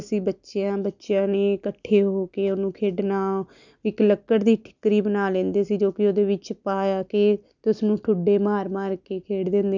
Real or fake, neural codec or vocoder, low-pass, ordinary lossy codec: real; none; 7.2 kHz; none